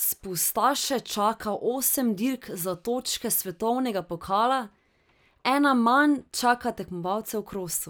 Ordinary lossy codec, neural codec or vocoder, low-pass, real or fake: none; none; none; real